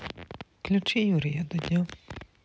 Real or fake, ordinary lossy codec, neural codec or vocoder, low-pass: real; none; none; none